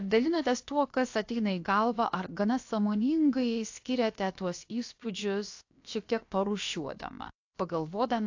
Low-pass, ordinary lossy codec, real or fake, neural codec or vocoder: 7.2 kHz; AAC, 48 kbps; fake; codec, 16 kHz, about 1 kbps, DyCAST, with the encoder's durations